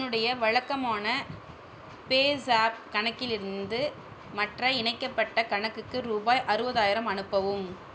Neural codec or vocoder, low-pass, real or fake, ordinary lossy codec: none; none; real; none